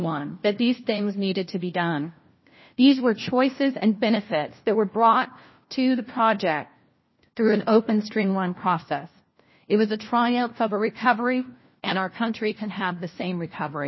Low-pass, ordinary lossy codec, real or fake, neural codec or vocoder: 7.2 kHz; MP3, 24 kbps; fake; codec, 16 kHz, 1 kbps, FunCodec, trained on LibriTTS, 50 frames a second